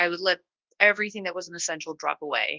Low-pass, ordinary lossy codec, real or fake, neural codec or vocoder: 7.2 kHz; Opus, 24 kbps; fake; codec, 24 kHz, 0.9 kbps, WavTokenizer, large speech release